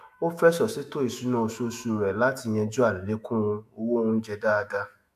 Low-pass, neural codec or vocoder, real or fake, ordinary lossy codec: 14.4 kHz; autoencoder, 48 kHz, 128 numbers a frame, DAC-VAE, trained on Japanese speech; fake; MP3, 96 kbps